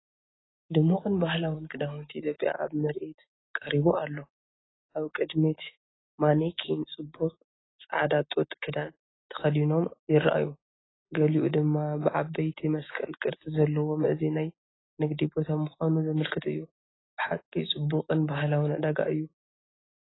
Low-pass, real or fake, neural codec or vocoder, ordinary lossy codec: 7.2 kHz; real; none; AAC, 16 kbps